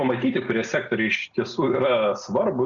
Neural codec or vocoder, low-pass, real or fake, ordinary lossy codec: none; 7.2 kHz; real; Opus, 64 kbps